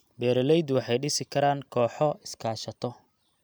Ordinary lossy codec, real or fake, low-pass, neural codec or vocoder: none; real; none; none